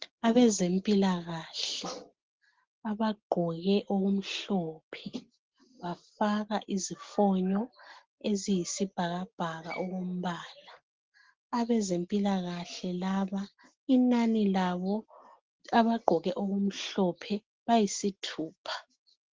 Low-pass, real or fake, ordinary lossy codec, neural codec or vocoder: 7.2 kHz; real; Opus, 16 kbps; none